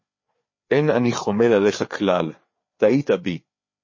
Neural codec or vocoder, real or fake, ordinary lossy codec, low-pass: codec, 16 kHz, 4 kbps, FreqCodec, larger model; fake; MP3, 32 kbps; 7.2 kHz